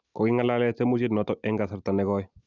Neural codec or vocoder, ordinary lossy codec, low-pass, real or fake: none; none; 7.2 kHz; real